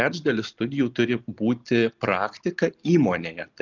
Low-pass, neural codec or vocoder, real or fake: 7.2 kHz; none; real